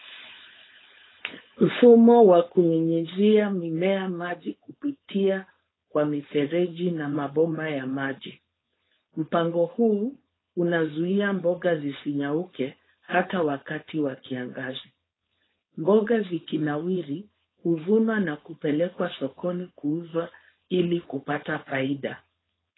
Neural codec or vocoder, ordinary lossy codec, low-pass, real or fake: codec, 16 kHz, 4.8 kbps, FACodec; AAC, 16 kbps; 7.2 kHz; fake